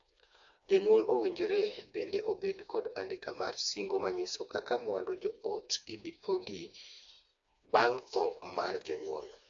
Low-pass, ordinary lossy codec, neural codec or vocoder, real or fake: 7.2 kHz; AAC, 64 kbps; codec, 16 kHz, 2 kbps, FreqCodec, smaller model; fake